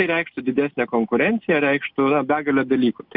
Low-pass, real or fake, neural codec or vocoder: 5.4 kHz; real; none